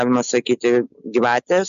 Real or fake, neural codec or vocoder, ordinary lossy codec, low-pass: real; none; MP3, 64 kbps; 7.2 kHz